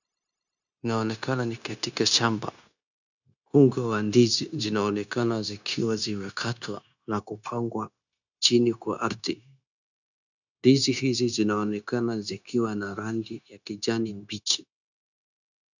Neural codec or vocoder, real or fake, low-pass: codec, 16 kHz, 0.9 kbps, LongCat-Audio-Codec; fake; 7.2 kHz